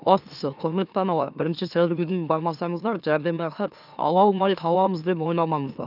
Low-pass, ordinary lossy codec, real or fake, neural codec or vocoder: 5.4 kHz; none; fake; autoencoder, 44.1 kHz, a latent of 192 numbers a frame, MeloTTS